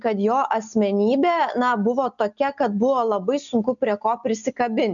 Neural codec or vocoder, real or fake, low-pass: none; real; 7.2 kHz